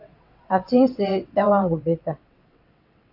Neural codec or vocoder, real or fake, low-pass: vocoder, 22.05 kHz, 80 mel bands, WaveNeXt; fake; 5.4 kHz